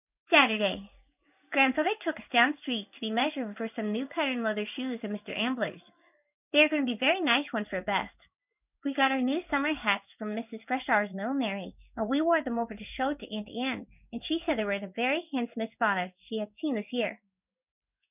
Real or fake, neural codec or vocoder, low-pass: fake; codec, 16 kHz in and 24 kHz out, 1 kbps, XY-Tokenizer; 3.6 kHz